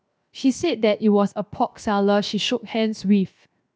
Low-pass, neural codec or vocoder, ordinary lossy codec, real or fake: none; codec, 16 kHz, 0.7 kbps, FocalCodec; none; fake